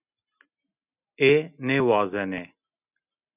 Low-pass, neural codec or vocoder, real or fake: 3.6 kHz; none; real